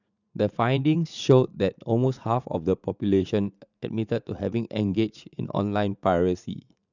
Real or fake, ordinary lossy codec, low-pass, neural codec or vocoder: fake; none; 7.2 kHz; vocoder, 44.1 kHz, 80 mel bands, Vocos